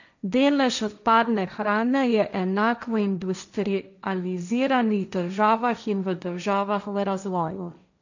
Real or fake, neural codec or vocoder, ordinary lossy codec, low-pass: fake; codec, 16 kHz, 1.1 kbps, Voila-Tokenizer; none; 7.2 kHz